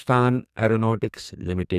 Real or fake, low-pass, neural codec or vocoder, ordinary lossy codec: fake; 14.4 kHz; codec, 44.1 kHz, 2.6 kbps, SNAC; none